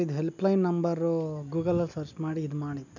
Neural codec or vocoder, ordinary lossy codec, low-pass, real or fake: none; none; 7.2 kHz; real